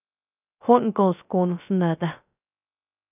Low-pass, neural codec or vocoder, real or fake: 3.6 kHz; codec, 16 kHz, 0.3 kbps, FocalCodec; fake